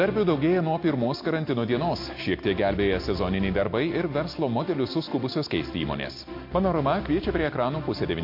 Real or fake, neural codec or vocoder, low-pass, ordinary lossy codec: real; none; 5.4 kHz; MP3, 32 kbps